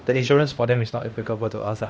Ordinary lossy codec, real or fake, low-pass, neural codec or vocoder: none; fake; none; codec, 16 kHz, 1 kbps, X-Codec, HuBERT features, trained on LibriSpeech